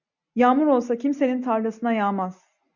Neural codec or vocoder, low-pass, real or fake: none; 7.2 kHz; real